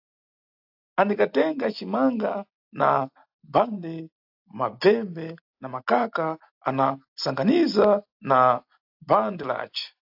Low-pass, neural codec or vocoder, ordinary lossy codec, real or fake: 5.4 kHz; none; AAC, 48 kbps; real